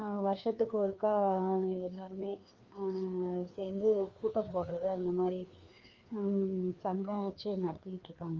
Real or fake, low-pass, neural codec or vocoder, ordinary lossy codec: fake; 7.2 kHz; codec, 16 kHz, 2 kbps, FreqCodec, larger model; Opus, 32 kbps